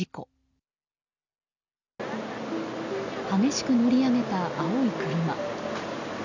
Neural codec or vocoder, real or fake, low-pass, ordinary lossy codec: none; real; 7.2 kHz; none